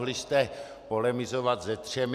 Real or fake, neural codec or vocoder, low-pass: real; none; 14.4 kHz